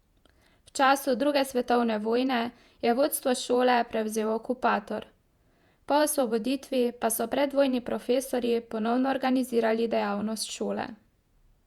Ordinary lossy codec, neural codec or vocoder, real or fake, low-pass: Opus, 64 kbps; vocoder, 48 kHz, 128 mel bands, Vocos; fake; 19.8 kHz